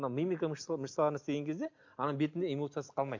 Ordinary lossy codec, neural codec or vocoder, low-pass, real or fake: MP3, 48 kbps; none; 7.2 kHz; real